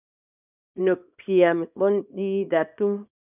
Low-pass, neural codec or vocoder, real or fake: 3.6 kHz; codec, 24 kHz, 0.9 kbps, WavTokenizer, small release; fake